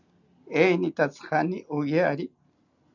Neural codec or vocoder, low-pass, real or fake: none; 7.2 kHz; real